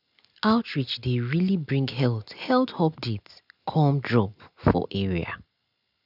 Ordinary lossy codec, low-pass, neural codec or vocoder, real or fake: none; 5.4 kHz; none; real